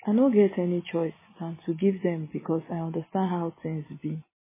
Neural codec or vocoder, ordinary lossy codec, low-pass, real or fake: none; MP3, 16 kbps; 3.6 kHz; real